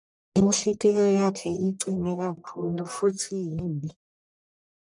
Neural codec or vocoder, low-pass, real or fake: codec, 44.1 kHz, 1.7 kbps, Pupu-Codec; 10.8 kHz; fake